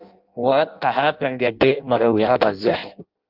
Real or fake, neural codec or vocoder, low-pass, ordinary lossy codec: fake; codec, 16 kHz in and 24 kHz out, 0.6 kbps, FireRedTTS-2 codec; 5.4 kHz; Opus, 24 kbps